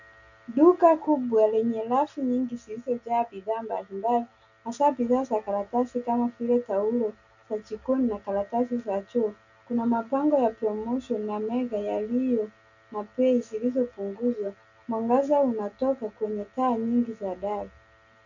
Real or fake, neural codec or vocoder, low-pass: real; none; 7.2 kHz